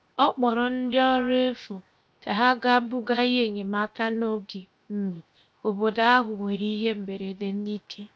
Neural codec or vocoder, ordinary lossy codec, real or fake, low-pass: codec, 16 kHz, 0.7 kbps, FocalCodec; none; fake; none